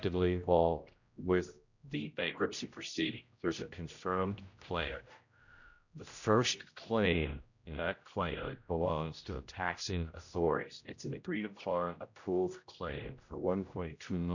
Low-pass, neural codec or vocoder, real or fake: 7.2 kHz; codec, 16 kHz, 0.5 kbps, X-Codec, HuBERT features, trained on general audio; fake